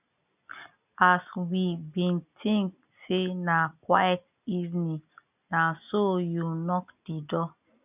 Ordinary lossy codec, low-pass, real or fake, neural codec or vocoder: none; 3.6 kHz; real; none